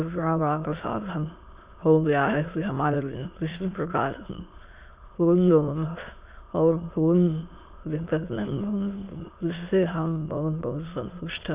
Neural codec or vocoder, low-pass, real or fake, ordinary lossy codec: autoencoder, 22.05 kHz, a latent of 192 numbers a frame, VITS, trained on many speakers; 3.6 kHz; fake; Opus, 64 kbps